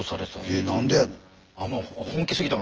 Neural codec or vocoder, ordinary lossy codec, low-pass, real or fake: vocoder, 24 kHz, 100 mel bands, Vocos; Opus, 16 kbps; 7.2 kHz; fake